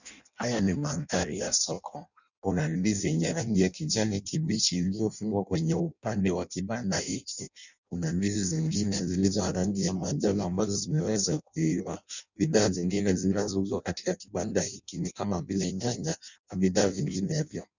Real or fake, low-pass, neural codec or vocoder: fake; 7.2 kHz; codec, 16 kHz in and 24 kHz out, 0.6 kbps, FireRedTTS-2 codec